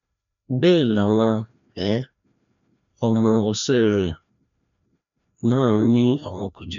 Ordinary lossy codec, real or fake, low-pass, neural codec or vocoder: none; fake; 7.2 kHz; codec, 16 kHz, 1 kbps, FreqCodec, larger model